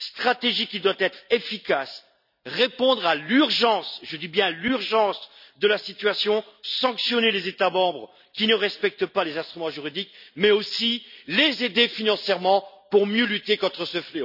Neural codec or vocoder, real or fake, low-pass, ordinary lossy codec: none; real; 5.4 kHz; none